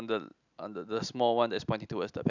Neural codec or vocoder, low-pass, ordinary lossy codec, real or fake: none; 7.2 kHz; none; real